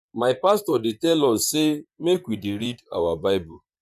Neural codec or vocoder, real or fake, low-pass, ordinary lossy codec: vocoder, 44.1 kHz, 128 mel bands, Pupu-Vocoder; fake; 14.4 kHz; none